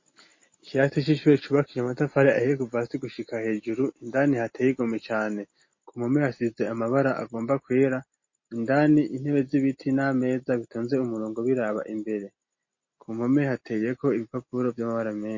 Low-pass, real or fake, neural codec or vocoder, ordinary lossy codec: 7.2 kHz; real; none; MP3, 32 kbps